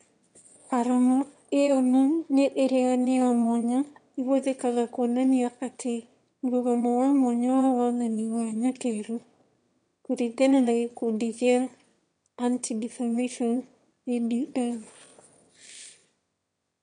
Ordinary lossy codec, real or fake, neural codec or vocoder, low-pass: MP3, 64 kbps; fake; autoencoder, 22.05 kHz, a latent of 192 numbers a frame, VITS, trained on one speaker; 9.9 kHz